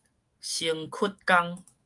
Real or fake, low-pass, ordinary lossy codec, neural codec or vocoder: fake; 10.8 kHz; Opus, 32 kbps; autoencoder, 48 kHz, 128 numbers a frame, DAC-VAE, trained on Japanese speech